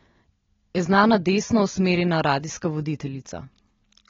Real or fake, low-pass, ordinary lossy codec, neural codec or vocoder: real; 7.2 kHz; AAC, 24 kbps; none